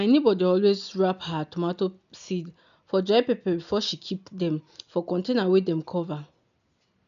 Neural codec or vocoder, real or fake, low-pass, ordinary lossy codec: none; real; 7.2 kHz; none